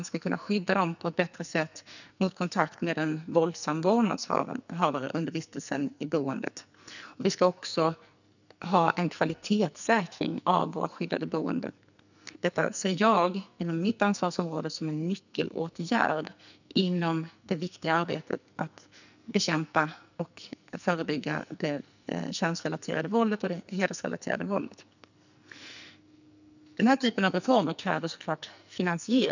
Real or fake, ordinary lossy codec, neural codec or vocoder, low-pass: fake; none; codec, 44.1 kHz, 2.6 kbps, SNAC; 7.2 kHz